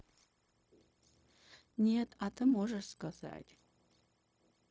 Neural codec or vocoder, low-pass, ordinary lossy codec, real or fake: codec, 16 kHz, 0.4 kbps, LongCat-Audio-Codec; none; none; fake